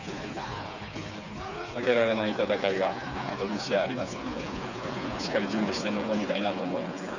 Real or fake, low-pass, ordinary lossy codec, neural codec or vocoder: fake; 7.2 kHz; none; codec, 16 kHz, 4 kbps, FreqCodec, smaller model